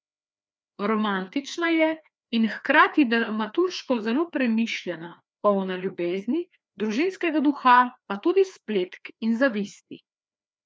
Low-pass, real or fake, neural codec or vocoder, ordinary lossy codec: none; fake; codec, 16 kHz, 2 kbps, FreqCodec, larger model; none